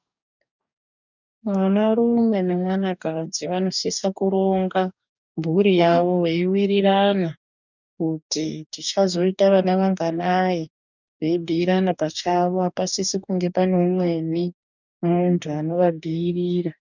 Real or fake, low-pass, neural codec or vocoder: fake; 7.2 kHz; codec, 44.1 kHz, 2.6 kbps, DAC